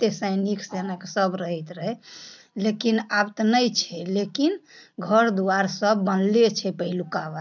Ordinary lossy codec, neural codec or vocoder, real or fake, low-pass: none; none; real; 7.2 kHz